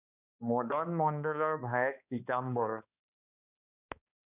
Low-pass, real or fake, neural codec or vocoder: 3.6 kHz; fake; codec, 16 kHz, 2 kbps, X-Codec, HuBERT features, trained on balanced general audio